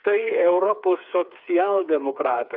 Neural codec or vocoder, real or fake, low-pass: codec, 16 kHz, 4 kbps, FreqCodec, smaller model; fake; 5.4 kHz